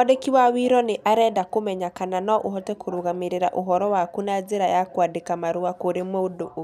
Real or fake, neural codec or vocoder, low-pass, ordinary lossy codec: real; none; 14.4 kHz; none